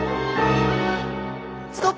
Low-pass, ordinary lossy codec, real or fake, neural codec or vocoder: none; none; real; none